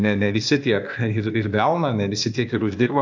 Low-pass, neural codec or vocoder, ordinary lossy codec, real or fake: 7.2 kHz; codec, 16 kHz, 0.8 kbps, ZipCodec; AAC, 48 kbps; fake